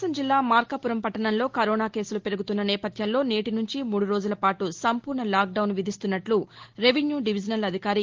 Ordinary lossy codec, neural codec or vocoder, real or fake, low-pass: Opus, 16 kbps; none; real; 7.2 kHz